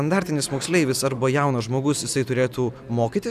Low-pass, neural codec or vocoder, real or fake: 14.4 kHz; none; real